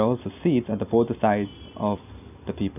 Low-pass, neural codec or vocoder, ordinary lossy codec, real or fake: 3.6 kHz; none; none; real